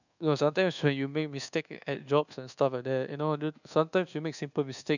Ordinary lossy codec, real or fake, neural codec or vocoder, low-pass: none; fake; codec, 24 kHz, 1.2 kbps, DualCodec; 7.2 kHz